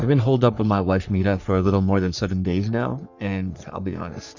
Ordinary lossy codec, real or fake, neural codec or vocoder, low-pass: Opus, 64 kbps; fake; codec, 44.1 kHz, 3.4 kbps, Pupu-Codec; 7.2 kHz